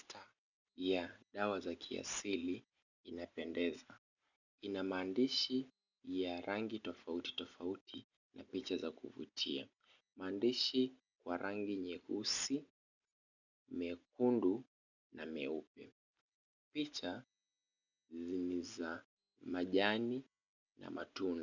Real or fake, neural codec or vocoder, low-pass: real; none; 7.2 kHz